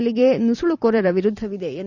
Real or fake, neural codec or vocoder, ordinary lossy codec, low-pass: fake; vocoder, 44.1 kHz, 128 mel bands every 256 samples, BigVGAN v2; Opus, 64 kbps; 7.2 kHz